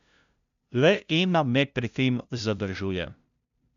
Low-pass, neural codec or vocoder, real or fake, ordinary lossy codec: 7.2 kHz; codec, 16 kHz, 0.5 kbps, FunCodec, trained on LibriTTS, 25 frames a second; fake; none